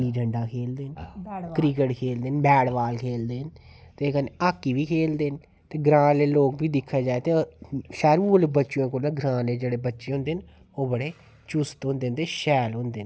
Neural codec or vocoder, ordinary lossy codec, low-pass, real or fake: none; none; none; real